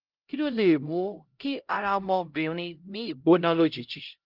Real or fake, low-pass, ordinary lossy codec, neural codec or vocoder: fake; 5.4 kHz; Opus, 32 kbps; codec, 16 kHz, 0.5 kbps, X-Codec, HuBERT features, trained on LibriSpeech